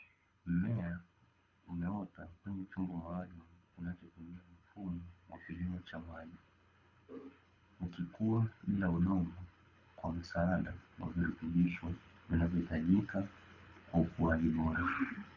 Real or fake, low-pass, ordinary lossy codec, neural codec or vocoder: fake; 7.2 kHz; Opus, 64 kbps; codec, 24 kHz, 6 kbps, HILCodec